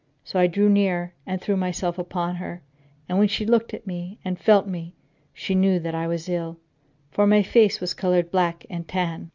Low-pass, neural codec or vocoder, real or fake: 7.2 kHz; none; real